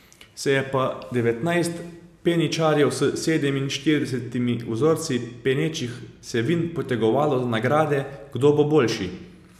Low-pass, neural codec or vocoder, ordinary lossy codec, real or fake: 14.4 kHz; none; none; real